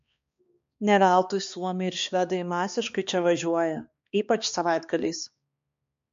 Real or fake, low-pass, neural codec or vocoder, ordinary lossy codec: fake; 7.2 kHz; codec, 16 kHz, 2 kbps, X-Codec, HuBERT features, trained on balanced general audio; MP3, 48 kbps